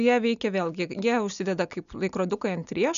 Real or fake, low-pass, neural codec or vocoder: real; 7.2 kHz; none